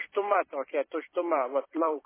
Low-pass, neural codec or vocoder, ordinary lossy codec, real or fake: 3.6 kHz; vocoder, 44.1 kHz, 128 mel bands every 256 samples, BigVGAN v2; MP3, 16 kbps; fake